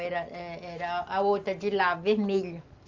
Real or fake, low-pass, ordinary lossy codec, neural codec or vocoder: real; 7.2 kHz; Opus, 32 kbps; none